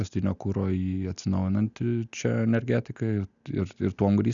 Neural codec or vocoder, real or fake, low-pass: none; real; 7.2 kHz